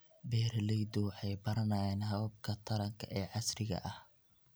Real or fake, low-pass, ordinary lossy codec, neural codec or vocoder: real; none; none; none